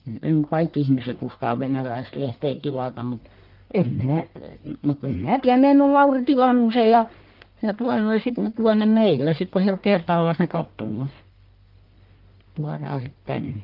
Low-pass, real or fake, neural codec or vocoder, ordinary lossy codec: 5.4 kHz; fake; codec, 44.1 kHz, 1.7 kbps, Pupu-Codec; Opus, 32 kbps